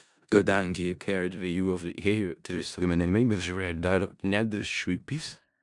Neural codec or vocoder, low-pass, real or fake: codec, 16 kHz in and 24 kHz out, 0.4 kbps, LongCat-Audio-Codec, four codebook decoder; 10.8 kHz; fake